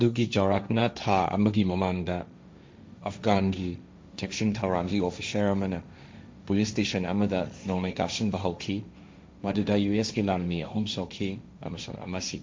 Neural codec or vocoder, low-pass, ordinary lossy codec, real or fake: codec, 16 kHz, 1.1 kbps, Voila-Tokenizer; none; none; fake